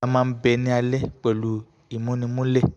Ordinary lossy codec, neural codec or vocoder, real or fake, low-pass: MP3, 96 kbps; none; real; 10.8 kHz